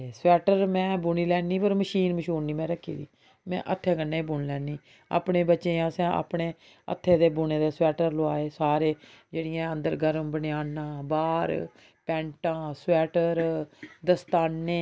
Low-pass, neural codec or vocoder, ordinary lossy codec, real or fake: none; none; none; real